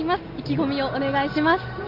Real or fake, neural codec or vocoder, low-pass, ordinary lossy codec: real; none; 5.4 kHz; Opus, 32 kbps